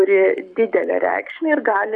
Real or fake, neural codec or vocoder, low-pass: fake; codec, 44.1 kHz, 7.8 kbps, Pupu-Codec; 10.8 kHz